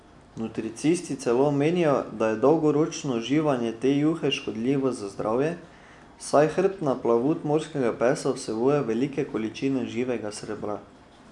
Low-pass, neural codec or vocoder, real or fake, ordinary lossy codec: 10.8 kHz; none; real; none